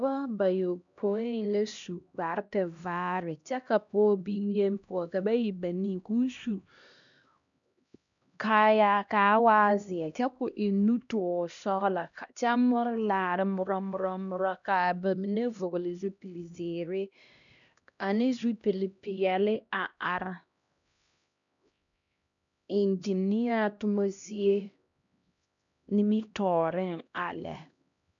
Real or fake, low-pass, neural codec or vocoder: fake; 7.2 kHz; codec, 16 kHz, 1 kbps, X-Codec, HuBERT features, trained on LibriSpeech